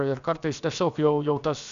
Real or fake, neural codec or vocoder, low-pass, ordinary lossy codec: fake; codec, 16 kHz, about 1 kbps, DyCAST, with the encoder's durations; 7.2 kHz; Opus, 64 kbps